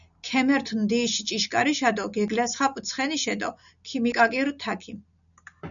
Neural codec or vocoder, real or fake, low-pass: none; real; 7.2 kHz